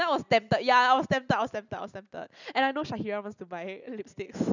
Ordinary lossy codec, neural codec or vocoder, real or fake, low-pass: none; none; real; 7.2 kHz